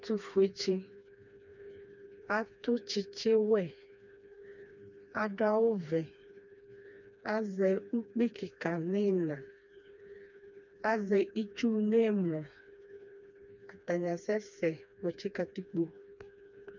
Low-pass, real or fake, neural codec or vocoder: 7.2 kHz; fake; codec, 16 kHz, 2 kbps, FreqCodec, smaller model